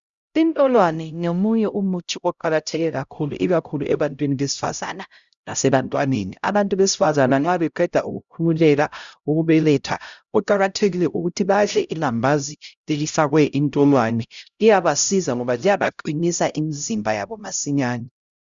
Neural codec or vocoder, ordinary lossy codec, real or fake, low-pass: codec, 16 kHz, 0.5 kbps, X-Codec, HuBERT features, trained on LibriSpeech; Opus, 64 kbps; fake; 7.2 kHz